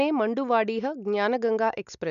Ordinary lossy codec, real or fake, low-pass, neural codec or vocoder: none; real; 7.2 kHz; none